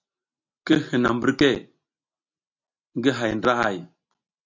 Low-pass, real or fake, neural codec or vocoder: 7.2 kHz; real; none